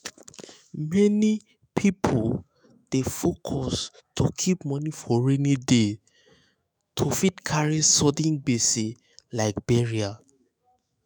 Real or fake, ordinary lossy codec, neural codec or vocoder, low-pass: fake; none; autoencoder, 48 kHz, 128 numbers a frame, DAC-VAE, trained on Japanese speech; none